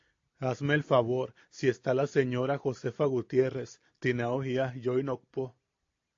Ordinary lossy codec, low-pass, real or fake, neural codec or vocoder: AAC, 48 kbps; 7.2 kHz; real; none